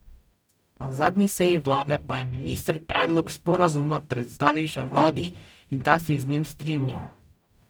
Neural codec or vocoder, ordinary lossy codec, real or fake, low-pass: codec, 44.1 kHz, 0.9 kbps, DAC; none; fake; none